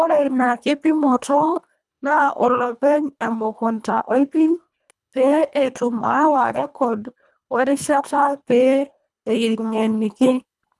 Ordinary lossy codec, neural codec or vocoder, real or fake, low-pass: none; codec, 24 kHz, 1.5 kbps, HILCodec; fake; none